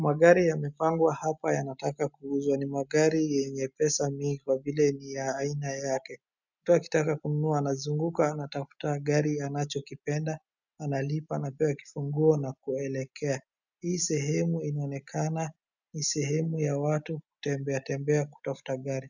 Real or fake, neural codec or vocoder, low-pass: real; none; 7.2 kHz